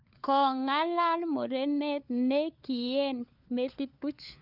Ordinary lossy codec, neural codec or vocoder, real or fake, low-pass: none; codec, 16 kHz, 4 kbps, FunCodec, trained on LibriTTS, 50 frames a second; fake; 5.4 kHz